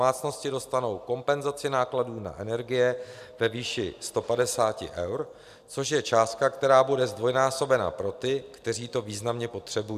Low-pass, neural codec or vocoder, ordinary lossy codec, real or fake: 14.4 kHz; none; AAC, 96 kbps; real